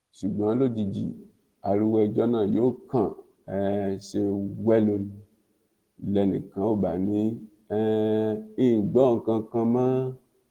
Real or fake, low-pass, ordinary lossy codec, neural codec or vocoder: real; 19.8 kHz; Opus, 16 kbps; none